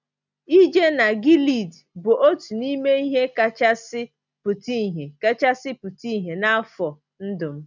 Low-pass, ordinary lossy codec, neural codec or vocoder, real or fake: 7.2 kHz; none; none; real